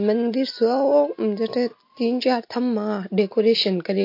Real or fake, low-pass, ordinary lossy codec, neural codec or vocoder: real; 5.4 kHz; MP3, 32 kbps; none